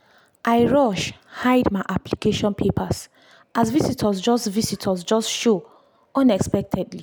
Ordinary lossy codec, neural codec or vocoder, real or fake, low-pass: none; none; real; none